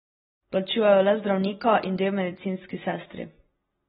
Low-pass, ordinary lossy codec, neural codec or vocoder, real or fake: 19.8 kHz; AAC, 16 kbps; none; real